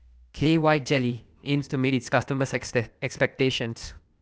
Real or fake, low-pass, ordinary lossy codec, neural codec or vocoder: fake; none; none; codec, 16 kHz, 0.8 kbps, ZipCodec